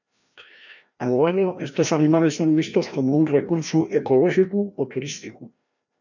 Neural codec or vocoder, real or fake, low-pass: codec, 16 kHz, 1 kbps, FreqCodec, larger model; fake; 7.2 kHz